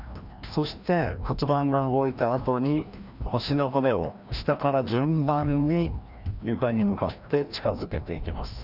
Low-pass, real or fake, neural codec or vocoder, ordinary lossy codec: 5.4 kHz; fake; codec, 16 kHz, 1 kbps, FreqCodec, larger model; none